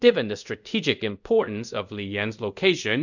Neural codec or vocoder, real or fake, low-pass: codec, 24 kHz, 0.5 kbps, DualCodec; fake; 7.2 kHz